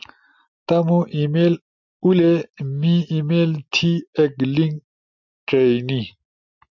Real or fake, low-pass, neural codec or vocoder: real; 7.2 kHz; none